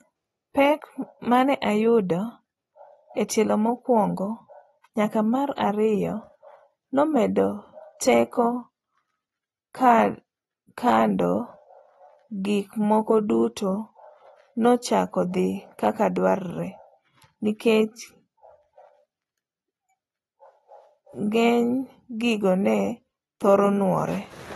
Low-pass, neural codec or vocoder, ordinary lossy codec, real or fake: 19.8 kHz; none; AAC, 32 kbps; real